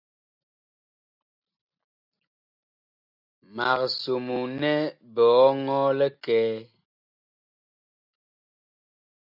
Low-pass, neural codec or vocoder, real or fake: 5.4 kHz; none; real